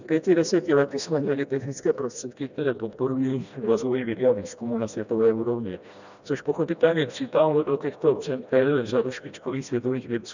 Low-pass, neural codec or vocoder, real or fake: 7.2 kHz; codec, 16 kHz, 1 kbps, FreqCodec, smaller model; fake